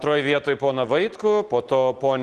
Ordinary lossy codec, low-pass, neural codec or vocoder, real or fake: Opus, 16 kbps; 10.8 kHz; none; real